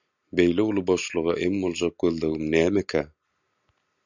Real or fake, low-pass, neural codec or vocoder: real; 7.2 kHz; none